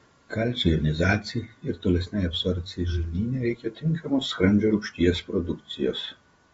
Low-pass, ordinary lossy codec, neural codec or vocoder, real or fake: 19.8 kHz; AAC, 24 kbps; vocoder, 48 kHz, 128 mel bands, Vocos; fake